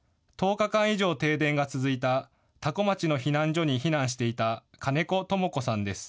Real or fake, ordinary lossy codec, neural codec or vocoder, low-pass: real; none; none; none